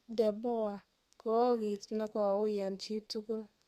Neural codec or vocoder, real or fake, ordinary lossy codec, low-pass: codec, 32 kHz, 1.9 kbps, SNAC; fake; Opus, 64 kbps; 14.4 kHz